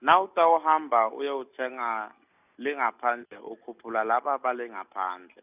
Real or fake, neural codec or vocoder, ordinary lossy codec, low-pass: real; none; none; 3.6 kHz